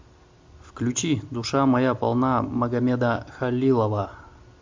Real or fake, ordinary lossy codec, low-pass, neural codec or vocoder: real; MP3, 64 kbps; 7.2 kHz; none